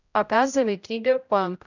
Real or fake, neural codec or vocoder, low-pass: fake; codec, 16 kHz, 0.5 kbps, X-Codec, HuBERT features, trained on general audio; 7.2 kHz